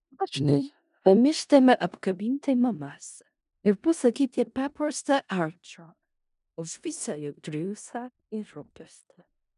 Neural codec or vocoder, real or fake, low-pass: codec, 16 kHz in and 24 kHz out, 0.4 kbps, LongCat-Audio-Codec, four codebook decoder; fake; 10.8 kHz